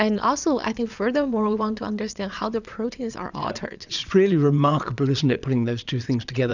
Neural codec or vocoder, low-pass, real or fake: vocoder, 22.05 kHz, 80 mel bands, Vocos; 7.2 kHz; fake